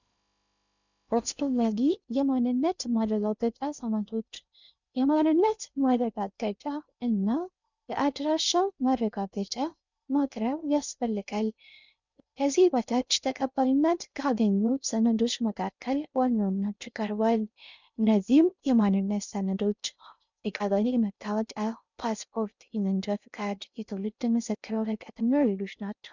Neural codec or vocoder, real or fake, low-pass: codec, 16 kHz in and 24 kHz out, 0.6 kbps, FocalCodec, streaming, 2048 codes; fake; 7.2 kHz